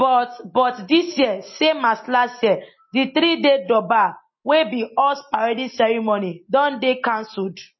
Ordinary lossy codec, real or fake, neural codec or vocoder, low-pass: MP3, 24 kbps; real; none; 7.2 kHz